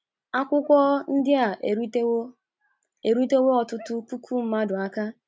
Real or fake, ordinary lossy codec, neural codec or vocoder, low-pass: real; none; none; none